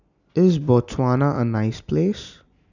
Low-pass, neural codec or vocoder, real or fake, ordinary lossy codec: 7.2 kHz; none; real; none